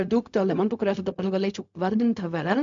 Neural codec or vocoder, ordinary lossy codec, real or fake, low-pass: codec, 16 kHz, 0.4 kbps, LongCat-Audio-Codec; MP3, 64 kbps; fake; 7.2 kHz